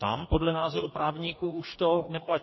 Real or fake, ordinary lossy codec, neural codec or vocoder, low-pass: fake; MP3, 24 kbps; codec, 44.1 kHz, 2.6 kbps, DAC; 7.2 kHz